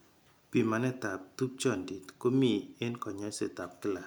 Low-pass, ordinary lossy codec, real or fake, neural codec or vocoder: none; none; real; none